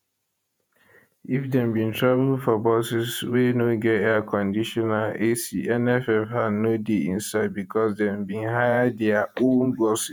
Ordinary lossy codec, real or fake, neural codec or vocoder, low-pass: none; fake; vocoder, 48 kHz, 128 mel bands, Vocos; none